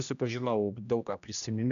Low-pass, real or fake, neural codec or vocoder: 7.2 kHz; fake; codec, 16 kHz, 1 kbps, X-Codec, HuBERT features, trained on general audio